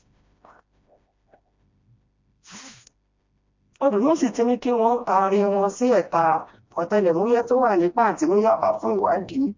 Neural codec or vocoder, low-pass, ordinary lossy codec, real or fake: codec, 16 kHz, 1 kbps, FreqCodec, smaller model; 7.2 kHz; MP3, 48 kbps; fake